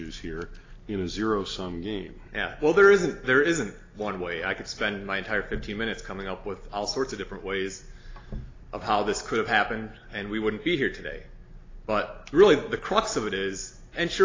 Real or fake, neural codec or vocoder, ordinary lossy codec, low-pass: real; none; AAC, 32 kbps; 7.2 kHz